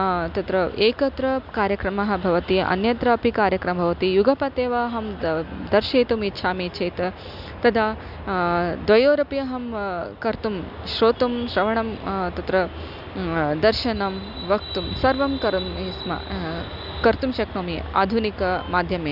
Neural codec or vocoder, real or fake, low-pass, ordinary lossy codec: none; real; 5.4 kHz; none